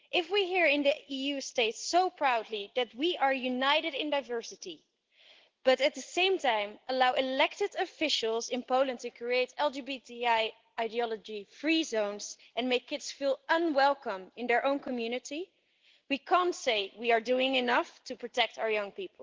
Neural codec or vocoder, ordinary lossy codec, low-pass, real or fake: none; Opus, 16 kbps; 7.2 kHz; real